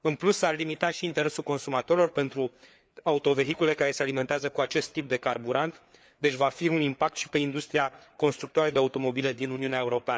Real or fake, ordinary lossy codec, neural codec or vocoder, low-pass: fake; none; codec, 16 kHz, 4 kbps, FreqCodec, larger model; none